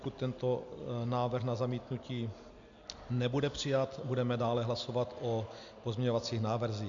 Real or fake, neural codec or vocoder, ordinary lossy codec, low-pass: real; none; MP3, 64 kbps; 7.2 kHz